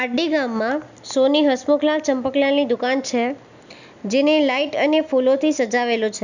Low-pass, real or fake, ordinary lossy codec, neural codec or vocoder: 7.2 kHz; real; none; none